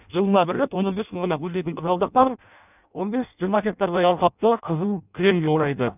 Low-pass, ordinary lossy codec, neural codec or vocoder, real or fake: 3.6 kHz; none; codec, 16 kHz in and 24 kHz out, 0.6 kbps, FireRedTTS-2 codec; fake